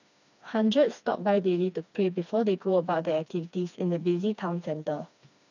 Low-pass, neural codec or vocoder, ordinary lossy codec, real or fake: 7.2 kHz; codec, 16 kHz, 2 kbps, FreqCodec, smaller model; none; fake